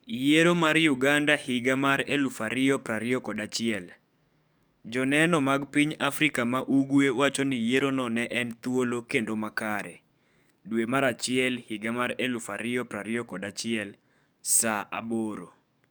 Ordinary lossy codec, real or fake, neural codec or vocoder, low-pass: none; fake; codec, 44.1 kHz, 7.8 kbps, DAC; none